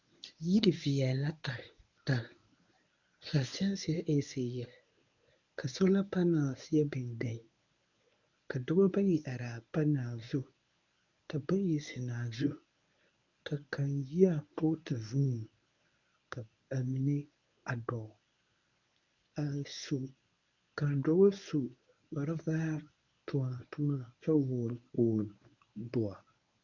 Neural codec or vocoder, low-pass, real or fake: codec, 24 kHz, 0.9 kbps, WavTokenizer, medium speech release version 1; 7.2 kHz; fake